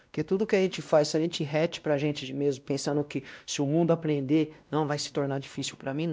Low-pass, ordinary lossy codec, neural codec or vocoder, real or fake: none; none; codec, 16 kHz, 1 kbps, X-Codec, WavLM features, trained on Multilingual LibriSpeech; fake